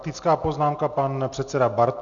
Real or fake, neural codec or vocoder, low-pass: real; none; 7.2 kHz